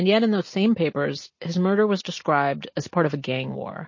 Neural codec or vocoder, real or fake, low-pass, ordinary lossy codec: none; real; 7.2 kHz; MP3, 32 kbps